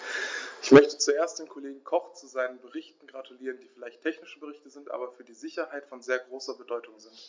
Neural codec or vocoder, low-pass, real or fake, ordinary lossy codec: none; 7.2 kHz; real; MP3, 64 kbps